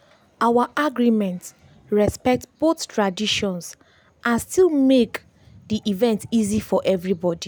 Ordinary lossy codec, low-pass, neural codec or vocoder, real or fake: none; none; none; real